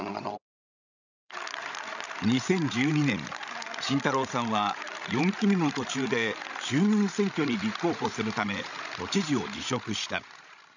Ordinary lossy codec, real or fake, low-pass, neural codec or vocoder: none; fake; 7.2 kHz; codec, 16 kHz, 16 kbps, FreqCodec, larger model